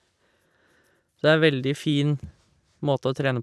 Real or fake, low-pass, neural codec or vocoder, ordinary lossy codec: real; none; none; none